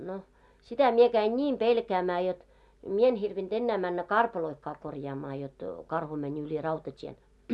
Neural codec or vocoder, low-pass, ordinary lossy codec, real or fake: none; none; none; real